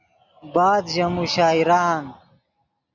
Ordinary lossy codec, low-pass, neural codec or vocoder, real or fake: MP3, 64 kbps; 7.2 kHz; none; real